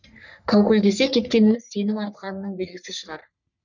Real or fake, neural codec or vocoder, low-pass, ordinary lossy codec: fake; codec, 44.1 kHz, 3.4 kbps, Pupu-Codec; 7.2 kHz; none